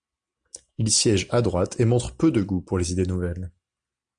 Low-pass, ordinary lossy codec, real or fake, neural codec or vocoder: 9.9 kHz; MP3, 64 kbps; real; none